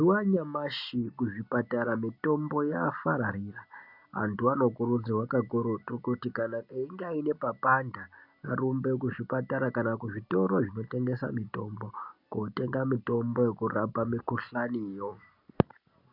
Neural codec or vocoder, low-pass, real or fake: none; 5.4 kHz; real